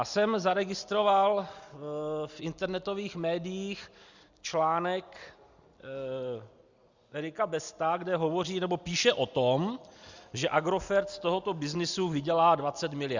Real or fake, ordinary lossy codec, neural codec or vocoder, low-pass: real; Opus, 64 kbps; none; 7.2 kHz